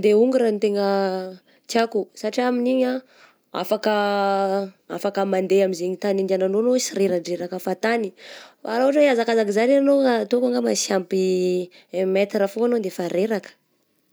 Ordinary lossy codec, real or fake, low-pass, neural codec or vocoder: none; real; none; none